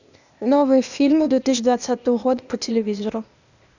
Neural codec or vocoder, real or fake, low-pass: codec, 16 kHz, 0.8 kbps, ZipCodec; fake; 7.2 kHz